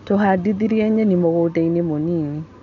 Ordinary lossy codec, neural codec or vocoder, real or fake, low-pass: none; none; real; 7.2 kHz